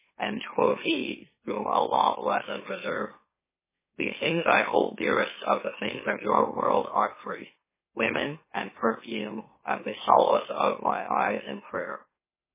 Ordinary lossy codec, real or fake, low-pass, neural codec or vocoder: MP3, 16 kbps; fake; 3.6 kHz; autoencoder, 44.1 kHz, a latent of 192 numbers a frame, MeloTTS